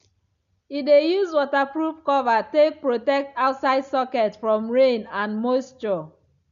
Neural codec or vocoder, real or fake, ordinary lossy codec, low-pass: none; real; MP3, 64 kbps; 7.2 kHz